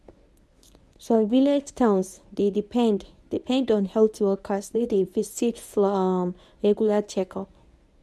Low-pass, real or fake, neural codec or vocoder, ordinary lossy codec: none; fake; codec, 24 kHz, 0.9 kbps, WavTokenizer, medium speech release version 1; none